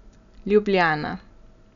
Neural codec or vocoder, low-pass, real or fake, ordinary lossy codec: none; 7.2 kHz; real; none